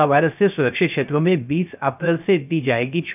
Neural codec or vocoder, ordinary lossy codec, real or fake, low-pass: codec, 16 kHz, 0.3 kbps, FocalCodec; none; fake; 3.6 kHz